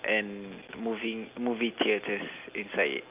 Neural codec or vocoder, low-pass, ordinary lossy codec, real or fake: none; 3.6 kHz; Opus, 24 kbps; real